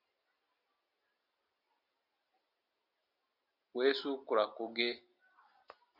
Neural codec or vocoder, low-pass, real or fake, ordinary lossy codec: none; 5.4 kHz; real; MP3, 48 kbps